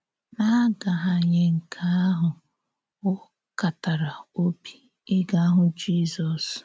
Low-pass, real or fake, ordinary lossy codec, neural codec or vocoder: none; real; none; none